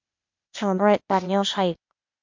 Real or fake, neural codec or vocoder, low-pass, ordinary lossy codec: fake; codec, 16 kHz, 0.8 kbps, ZipCodec; 7.2 kHz; MP3, 48 kbps